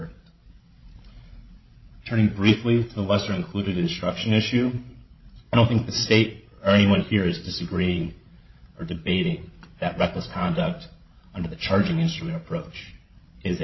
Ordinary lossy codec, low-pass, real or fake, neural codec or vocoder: MP3, 24 kbps; 7.2 kHz; fake; codec, 16 kHz, 16 kbps, FreqCodec, larger model